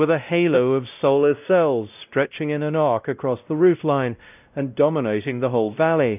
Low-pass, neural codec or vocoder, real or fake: 3.6 kHz; codec, 16 kHz, 0.5 kbps, X-Codec, WavLM features, trained on Multilingual LibriSpeech; fake